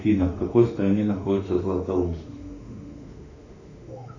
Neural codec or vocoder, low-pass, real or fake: autoencoder, 48 kHz, 32 numbers a frame, DAC-VAE, trained on Japanese speech; 7.2 kHz; fake